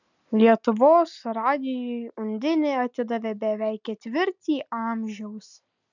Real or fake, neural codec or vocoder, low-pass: real; none; 7.2 kHz